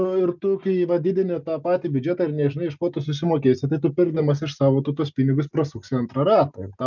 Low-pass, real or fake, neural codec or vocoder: 7.2 kHz; real; none